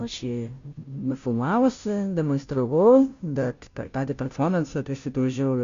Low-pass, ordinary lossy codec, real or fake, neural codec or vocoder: 7.2 kHz; MP3, 64 kbps; fake; codec, 16 kHz, 0.5 kbps, FunCodec, trained on Chinese and English, 25 frames a second